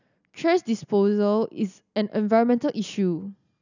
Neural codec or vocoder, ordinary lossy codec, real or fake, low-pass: none; none; real; 7.2 kHz